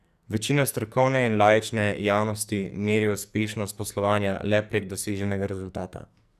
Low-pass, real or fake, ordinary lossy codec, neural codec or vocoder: 14.4 kHz; fake; none; codec, 44.1 kHz, 2.6 kbps, SNAC